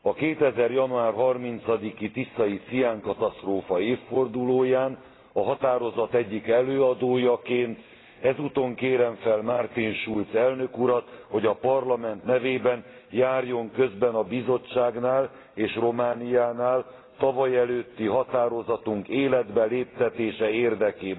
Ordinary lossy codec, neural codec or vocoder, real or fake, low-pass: AAC, 16 kbps; none; real; 7.2 kHz